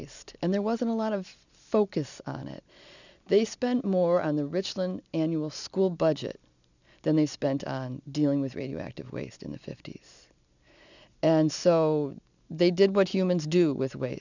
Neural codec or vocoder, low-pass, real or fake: none; 7.2 kHz; real